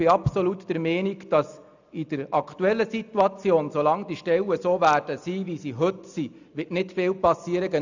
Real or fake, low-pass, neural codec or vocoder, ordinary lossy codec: real; 7.2 kHz; none; none